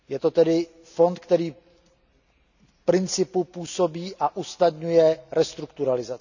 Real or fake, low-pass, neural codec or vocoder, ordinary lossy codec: real; 7.2 kHz; none; none